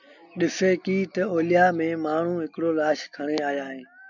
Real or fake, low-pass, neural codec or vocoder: real; 7.2 kHz; none